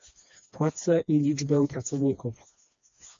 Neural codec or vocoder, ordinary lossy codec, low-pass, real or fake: codec, 16 kHz, 2 kbps, FreqCodec, smaller model; MP3, 48 kbps; 7.2 kHz; fake